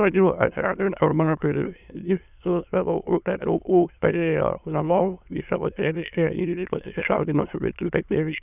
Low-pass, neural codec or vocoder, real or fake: 3.6 kHz; autoencoder, 22.05 kHz, a latent of 192 numbers a frame, VITS, trained on many speakers; fake